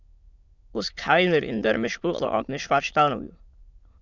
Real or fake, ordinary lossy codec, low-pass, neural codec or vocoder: fake; Opus, 64 kbps; 7.2 kHz; autoencoder, 22.05 kHz, a latent of 192 numbers a frame, VITS, trained on many speakers